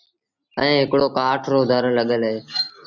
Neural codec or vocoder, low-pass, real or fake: none; 7.2 kHz; real